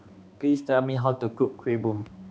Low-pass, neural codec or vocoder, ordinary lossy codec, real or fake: none; codec, 16 kHz, 2 kbps, X-Codec, HuBERT features, trained on balanced general audio; none; fake